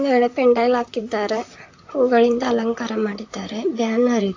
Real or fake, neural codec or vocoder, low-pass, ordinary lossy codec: fake; vocoder, 44.1 kHz, 128 mel bands, Pupu-Vocoder; 7.2 kHz; AAC, 48 kbps